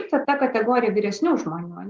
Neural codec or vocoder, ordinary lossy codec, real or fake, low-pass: none; Opus, 32 kbps; real; 7.2 kHz